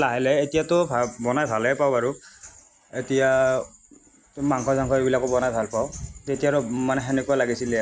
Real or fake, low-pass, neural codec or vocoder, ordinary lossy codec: real; none; none; none